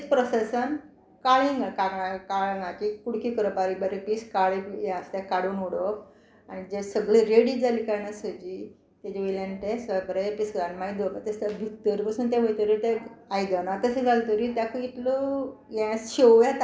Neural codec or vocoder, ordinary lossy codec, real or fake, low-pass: none; none; real; none